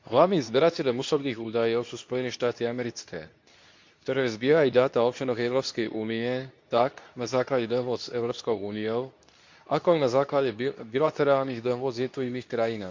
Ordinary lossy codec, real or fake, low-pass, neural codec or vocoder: MP3, 64 kbps; fake; 7.2 kHz; codec, 24 kHz, 0.9 kbps, WavTokenizer, medium speech release version 2